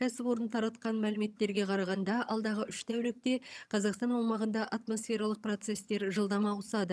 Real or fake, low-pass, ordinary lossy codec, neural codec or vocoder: fake; none; none; vocoder, 22.05 kHz, 80 mel bands, HiFi-GAN